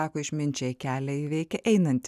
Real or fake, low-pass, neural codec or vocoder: real; 14.4 kHz; none